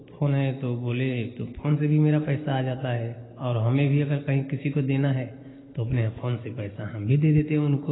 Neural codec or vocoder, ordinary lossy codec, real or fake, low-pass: none; AAC, 16 kbps; real; 7.2 kHz